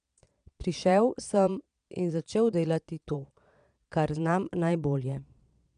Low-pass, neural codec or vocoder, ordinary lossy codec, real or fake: 9.9 kHz; vocoder, 22.05 kHz, 80 mel bands, Vocos; none; fake